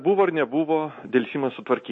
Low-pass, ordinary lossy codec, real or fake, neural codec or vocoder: 10.8 kHz; MP3, 32 kbps; real; none